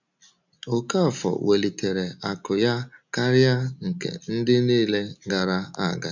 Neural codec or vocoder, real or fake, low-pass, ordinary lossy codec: none; real; 7.2 kHz; none